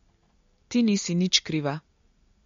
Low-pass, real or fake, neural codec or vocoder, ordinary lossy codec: 7.2 kHz; real; none; MP3, 48 kbps